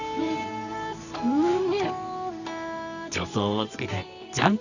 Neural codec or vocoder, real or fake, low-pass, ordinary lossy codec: codec, 24 kHz, 0.9 kbps, WavTokenizer, medium music audio release; fake; 7.2 kHz; none